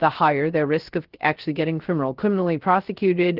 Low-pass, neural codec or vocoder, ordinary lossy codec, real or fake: 5.4 kHz; codec, 16 kHz, 0.3 kbps, FocalCodec; Opus, 16 kbps; fake